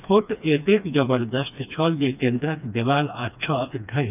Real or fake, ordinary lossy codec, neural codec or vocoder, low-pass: fake; none; codec, 16 kHz, 2 kbps, FreqCodec, smaller model; 3.6 kHz